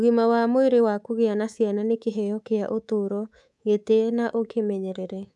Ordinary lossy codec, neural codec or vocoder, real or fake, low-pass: none; codec, 24 kHz, 3.1 kbps, DualCodec; fake; none